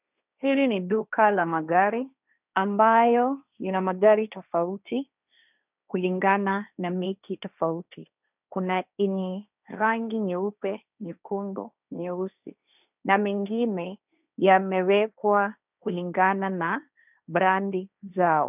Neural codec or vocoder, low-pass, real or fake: codec, 16 kHz, 1.1 kbps, Voila-Tokenizer; 3.6 kHz; fake